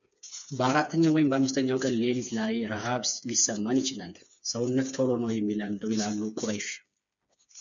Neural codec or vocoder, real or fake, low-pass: codec, 16 kHz, 4 kbps, FreqCodec, smaller model; fake; 7.2 kHz